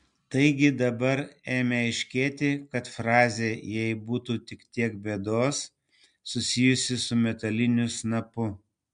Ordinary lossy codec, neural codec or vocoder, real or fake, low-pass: MP3, 64 kbps; none; real; 9.9 kHz